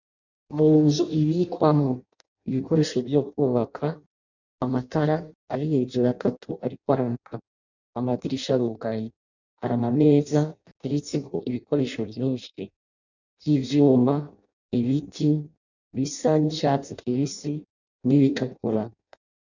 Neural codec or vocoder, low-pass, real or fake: codec, 16 kHz in and 24 kHz out, 0.6 kbps, FireRedTTS-2 codec; 7.2 kHz; fake